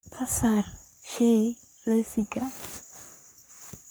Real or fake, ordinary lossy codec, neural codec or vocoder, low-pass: fake; none; codec, 44.1 kHz, 3.4 kbps, Pupu-Codec; none